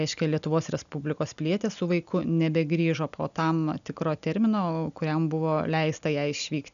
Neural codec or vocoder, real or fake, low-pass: none; real; 7.2 kHz